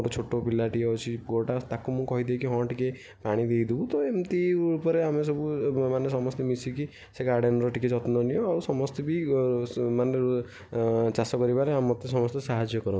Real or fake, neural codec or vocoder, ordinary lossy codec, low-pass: real; none; none; none